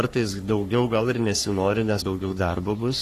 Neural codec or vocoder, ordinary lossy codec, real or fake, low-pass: codec, 44.1 kHz, 3.4 kbps, Pupu-Codec; AAC, 48 kbps; fake; 14.4 kHz